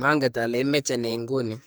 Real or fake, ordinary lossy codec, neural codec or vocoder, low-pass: fake; none; codec, 44.1 kHz, 2.6 kbps, SNAC; none